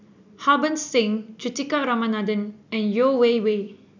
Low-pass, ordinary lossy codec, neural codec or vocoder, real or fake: 7.2 kHz; none; none; real